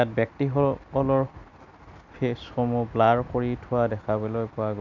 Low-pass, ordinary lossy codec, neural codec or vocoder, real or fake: 7.2 kHz; none; none; real